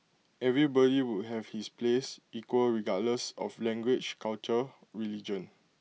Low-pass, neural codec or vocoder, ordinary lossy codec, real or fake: none; none; none; real